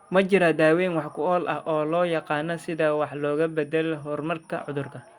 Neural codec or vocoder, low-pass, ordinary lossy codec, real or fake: none; 19.8 kHz; Opus, 64 kbps; real